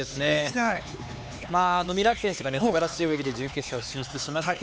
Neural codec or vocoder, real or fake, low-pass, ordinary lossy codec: codec, 16 kHz, 4 kbps, X-Codec, HuBERT features, trained on LibriSpeech; fake; none; none